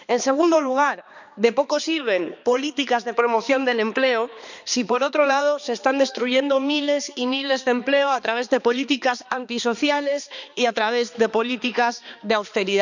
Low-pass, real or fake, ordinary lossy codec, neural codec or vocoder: 7.2 kHz; fake; none; codec, 16 kHz, 2 kbps, X-Codec, HuBERT features, trained on balanced general audio